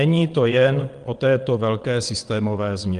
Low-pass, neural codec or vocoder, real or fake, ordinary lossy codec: 9.9 kHz; vocoder, 22.05 kHz, 80 mel bands, WaveNeXt; fake; Opus, 24 kbps